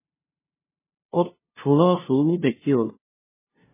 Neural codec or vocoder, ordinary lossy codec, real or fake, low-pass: codec, 16 kHz, 0.5 kbps, FunCodec, trained on LibriTTS, 25 frames a second; MP3, 16 kbps; fake; 3.6 kHz